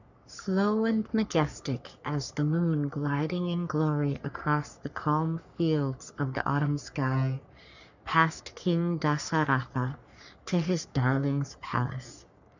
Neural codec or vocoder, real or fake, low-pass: codec, 44.1 kHz, 3.4 kbps, Pupu-Codec; fake; 7.2 kHz